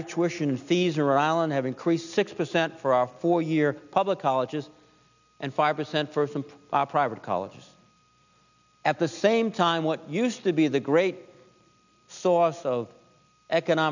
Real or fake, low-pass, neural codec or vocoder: real; 7.2 kHz; none